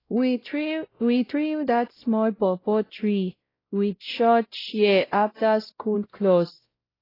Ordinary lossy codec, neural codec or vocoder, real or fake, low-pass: AAC, 24 kbps; codec, 16 kHz, 0.5 kbps, X-Codec, WavLM features, trained on Multilingual LibriSpeech; fake; 5.4 kHz